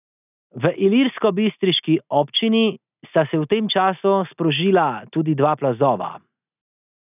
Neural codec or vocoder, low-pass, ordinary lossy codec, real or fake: none; 3.6 kHz; none; real